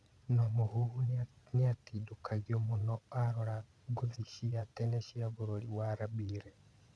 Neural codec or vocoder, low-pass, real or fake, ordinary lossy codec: vocoder, 22.05 kHz, 80 mel bands, Vocos; none; fake; none